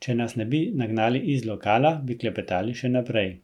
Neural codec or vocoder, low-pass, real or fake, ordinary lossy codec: none; 19.8 kHz; real; none